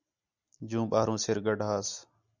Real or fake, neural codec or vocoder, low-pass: real; none; 7.2 kHz